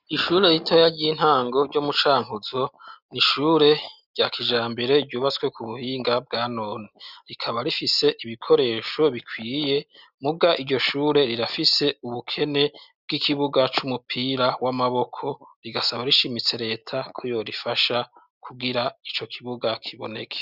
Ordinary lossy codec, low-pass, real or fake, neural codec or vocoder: Opus, 64 kbps; 5.4 kHz; real; none